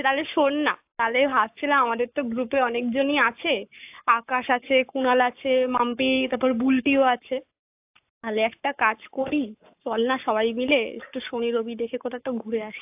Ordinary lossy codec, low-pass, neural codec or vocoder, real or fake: AAC, 32 kbps; 3.6 kHz; codec, 44.1 kHz, 7.8 kbps, Pupu-Codec; fake